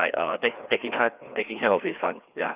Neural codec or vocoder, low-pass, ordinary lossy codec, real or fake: codec, 16 kHz, 2 kbps, FreqCodec, larger model; 3.6 kHz; Opus, 64 kbps; fake